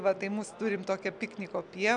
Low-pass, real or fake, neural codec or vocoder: 9.9 kHz; real; none